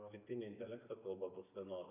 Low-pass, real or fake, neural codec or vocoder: 3.6 kHz; real; none